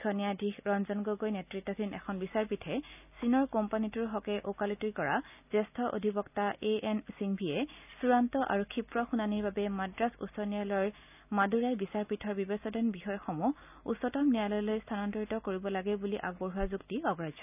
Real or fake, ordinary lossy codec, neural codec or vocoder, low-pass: real; none; none; 3.6 kHz